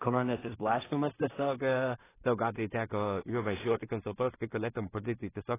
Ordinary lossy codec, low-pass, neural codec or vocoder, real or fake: AAC, 16 kbps; 3.6 kHz; codec, 16 kHz in and 24 kHz out, 0.4 kbps, LongCat-Audio-Codec, two codebook decoder; fake